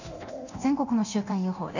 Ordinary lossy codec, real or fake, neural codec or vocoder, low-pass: none; fake; codec, 24 kHz, 0.9 kbps, DualCodec; 7.2 kHz